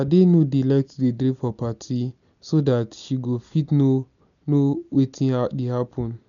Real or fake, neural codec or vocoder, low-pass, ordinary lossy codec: real; none; 7.2 kHz; none